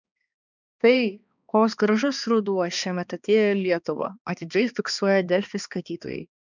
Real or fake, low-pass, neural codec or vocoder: fake; 7.2 kHz; codec, 16 kHz, 2 kbps, X-Codec, HuBERT features, trained on balanced general audio